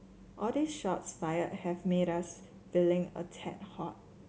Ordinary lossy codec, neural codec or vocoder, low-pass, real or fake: none; none; none; real